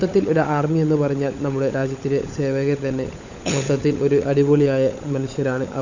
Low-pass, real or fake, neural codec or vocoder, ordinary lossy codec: 7.2 kHz; fake; codec, 16 kHz, 16 kbps, FunCodec, trained on LibriTTS, 50 frames a second; none